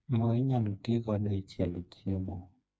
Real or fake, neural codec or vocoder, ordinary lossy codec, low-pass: fake; codec, 16 kHz, 2 kbps, FreqCodec, smaller model; none; none